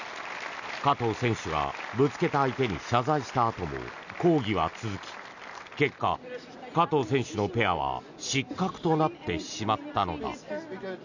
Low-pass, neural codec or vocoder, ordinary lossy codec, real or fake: 7.2 kHz; none; none; real